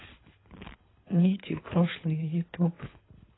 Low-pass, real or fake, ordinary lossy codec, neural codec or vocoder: 7.2 kHz; fake; AAC, 16 kbps; codec, 24 kHz, 3 kbps, HILCodec